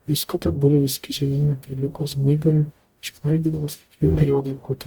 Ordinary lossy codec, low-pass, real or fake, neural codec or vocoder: Opus, 64 kbps; 19.8 kHz; fake; codec, 44.1 kHz, 0.9 kbps, DAC